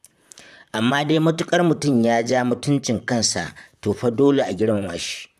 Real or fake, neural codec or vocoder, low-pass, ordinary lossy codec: fake; vocoder, 44.1 kHz, 128 mel bands, Pupu-Vocoder; 14.4 kHz; none